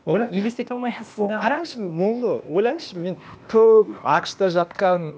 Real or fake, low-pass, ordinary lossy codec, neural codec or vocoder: fake; none; none; codec, 16 kHz, 0.8 kbps, ZipCodec